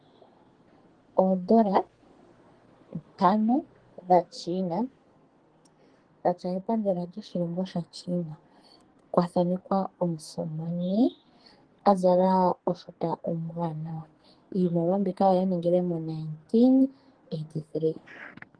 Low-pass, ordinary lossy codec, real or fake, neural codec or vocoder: 9.9 kHz; Opus, 16 kbps; fake; codec, 44.1 kHz, 2.6 kbps, SNAC